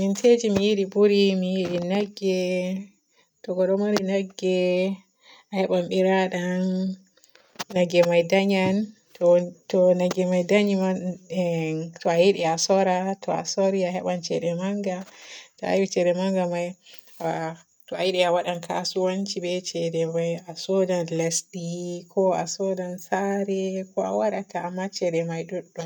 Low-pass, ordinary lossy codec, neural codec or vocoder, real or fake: 19.8 kHz; none; none; real